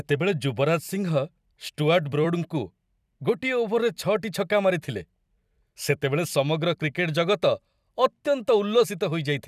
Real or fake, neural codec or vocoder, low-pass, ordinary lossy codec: fake; vocoder, 44.1 kHz, 128 mel bands every 256 samples, BigVGAN v2; 14.4 kHz; none